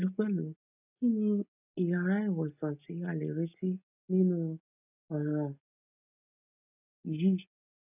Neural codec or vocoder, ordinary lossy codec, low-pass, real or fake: none; none; 3.6 kHz; real